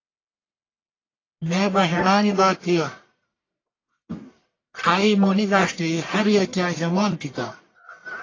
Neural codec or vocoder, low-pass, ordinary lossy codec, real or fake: codec, 44.1 kHz, 1.7 kbps, Pupu-Codec; 7.2 kHz; AAC, 32 kbps; fake